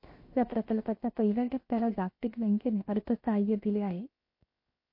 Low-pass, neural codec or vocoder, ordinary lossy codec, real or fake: 5.4 kHz; codec, 16 kHz, 0.7 kbps, FocalCodec; MP3, 32 kbps; fake